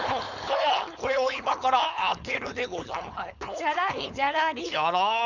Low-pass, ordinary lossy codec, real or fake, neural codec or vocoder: 7.2 kHz; none; fake; codec, 16 kHz, 4.8 kbps, FACodec